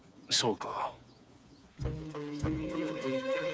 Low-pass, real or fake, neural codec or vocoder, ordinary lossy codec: none; fake; codec, 16 kHz, 4 kbps, FreqCodec, smaller model; none